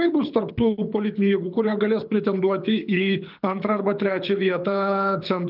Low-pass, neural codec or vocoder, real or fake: 5.4 kHz; codec, 24 kHz, 6 kbps, HILCodec; fake